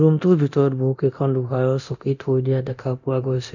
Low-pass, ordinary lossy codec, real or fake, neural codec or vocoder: 7.2 kHz; none; fake; codec, 24 kHz, 0.9 kbps, DualCodec